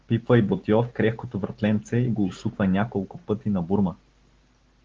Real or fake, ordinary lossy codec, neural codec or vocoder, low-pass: real; Opus, 16 kbps; none; 7.2 kHz